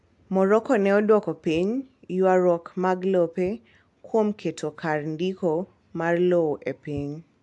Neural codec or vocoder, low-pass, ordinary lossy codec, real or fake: none; 10.8 kHz; AAC, 64 kbps; real